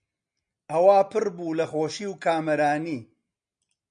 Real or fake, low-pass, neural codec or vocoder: real; 9.9 kHz; none